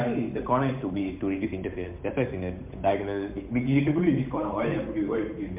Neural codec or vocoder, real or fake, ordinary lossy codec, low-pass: codec, 16 kHz, 8 kbps, FunCodec, trained on Chinese and English, 25 frames a second; fake; MP3, 32 kbps; 3.6 kHz